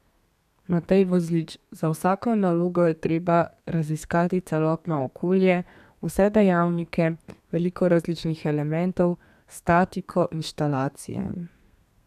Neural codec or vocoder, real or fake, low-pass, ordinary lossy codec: codec, 32 kHz, 1.9 kbps, SNAC; fake; 14.4 kHz; none